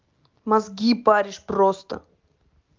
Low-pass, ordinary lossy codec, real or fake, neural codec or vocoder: 7.2 kHz; Opus, 24 kbps; real; none